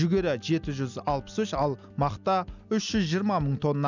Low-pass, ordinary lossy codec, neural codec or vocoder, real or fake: 7.2 kHz; none; none; real